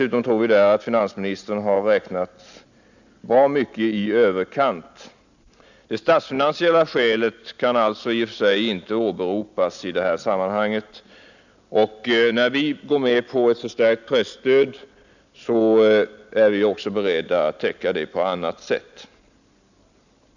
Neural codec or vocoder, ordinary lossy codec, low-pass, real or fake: none; none; 7.2 kHz; real